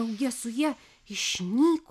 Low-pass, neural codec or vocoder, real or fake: 14.4 kHz; none; real